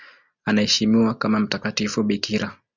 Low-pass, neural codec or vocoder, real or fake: 7.2 kHz; none; real